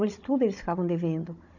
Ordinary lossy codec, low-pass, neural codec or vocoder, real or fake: none; 7.2 kHz; codec, 16 kHz, 16 kbps, FunCodec, trained on Chinese and English, 50 frames a second; fake